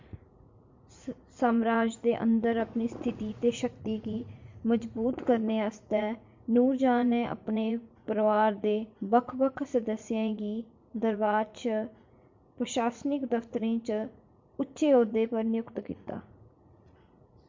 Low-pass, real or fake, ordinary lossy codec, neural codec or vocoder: 7.2 kHz; fake; MP3, 48 kbps; vocoder, 44.1 kHz, 80 mel bands, Vocos